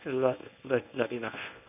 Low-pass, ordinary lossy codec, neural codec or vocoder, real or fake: 3.6 kHz; none; codec, 24 kHz, 0.9 kbps, WavTokenizer, medium speech release version 1; fake